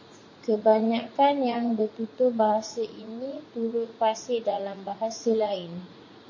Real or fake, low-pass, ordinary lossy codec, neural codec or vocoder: fake; 7.2 kHz; MP3, 32 kbps; vocoder, 44.1 kHz, 80 mel bands, Vocos